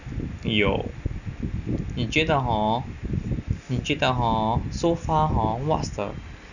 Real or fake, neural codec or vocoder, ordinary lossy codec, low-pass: real; none; none; 7.2 kHz